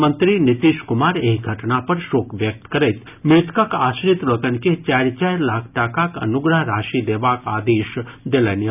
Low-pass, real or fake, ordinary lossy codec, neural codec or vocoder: 3.6 kHz; real; none; none